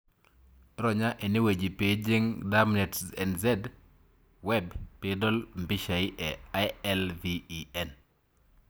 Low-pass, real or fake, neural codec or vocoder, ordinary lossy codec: none; real; none; none